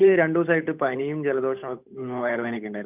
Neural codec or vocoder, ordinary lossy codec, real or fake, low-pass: codec, 16 kHz, 8 kbps, FunCodec, trained on Chinese and English, 25 frames a second; none; fake; 3.6 kHz